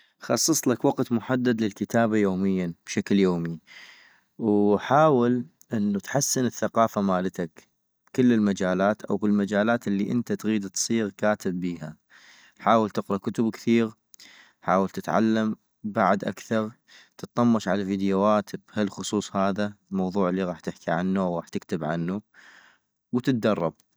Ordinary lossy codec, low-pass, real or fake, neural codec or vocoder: none; none; real; none